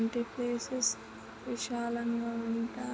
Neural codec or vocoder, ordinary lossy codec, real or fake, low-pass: none; none; real; none